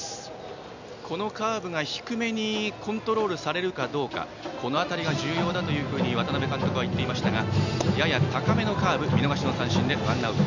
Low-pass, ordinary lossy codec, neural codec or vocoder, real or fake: 7.2 kHz; none; none; real